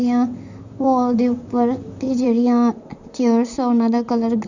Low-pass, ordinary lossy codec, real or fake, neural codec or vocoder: 7.2 kHz; none; fake; vocoder, 44.1 kHz, 128 mel bands, Pupu-Vocoder